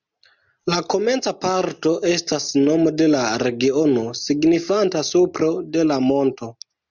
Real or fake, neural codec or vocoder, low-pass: real; none; 7.2 kHz